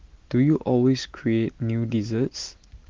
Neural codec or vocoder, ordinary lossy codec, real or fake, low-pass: none; Opus, 16 kbps; real; 7.2 kHz